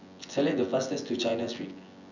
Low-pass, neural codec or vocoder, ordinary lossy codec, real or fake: 7.2 kHz; vocoder, 24 kHz, 100 mel bands, Vocos; none; fake